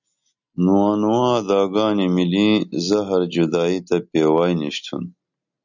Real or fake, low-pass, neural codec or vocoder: real; 7.2 kHz; none